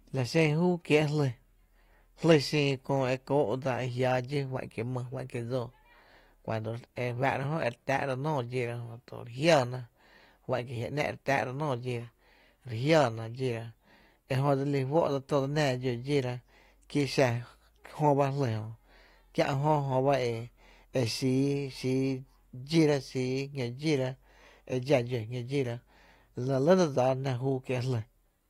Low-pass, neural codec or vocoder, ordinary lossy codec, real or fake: 19.8 kHz; none; AAC, 48 kbps; real